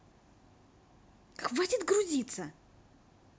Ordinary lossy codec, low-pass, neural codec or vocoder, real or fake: none; none; none; real